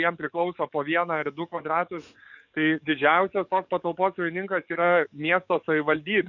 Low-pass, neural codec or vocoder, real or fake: 7.2 kHz; codec, 44.1 kHz, 7.8 kbps, DAC; fake